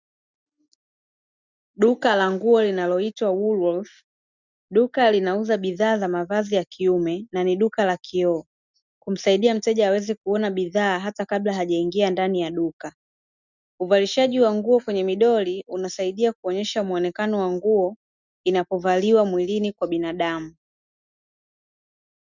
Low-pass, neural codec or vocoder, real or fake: 7.2 kHz; none; real